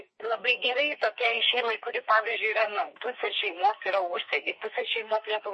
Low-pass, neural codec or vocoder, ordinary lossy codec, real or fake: 10.8 kHz; codec, 44.1 kHz, 2.6 kbps, SNAC; MP3, 32 kbps; fake